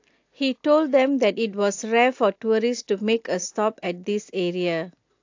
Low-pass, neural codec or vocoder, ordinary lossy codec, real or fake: 7.2 kHz; none; AAC, 48 kbps; real